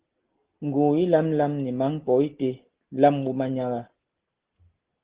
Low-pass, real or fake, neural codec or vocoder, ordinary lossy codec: 3.6 kHz; real; none; Opus, 16 kbps